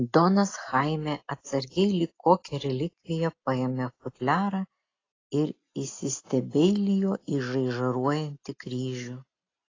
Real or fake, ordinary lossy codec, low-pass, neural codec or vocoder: real; AAC, 32 kbps; 7.2 kHz; none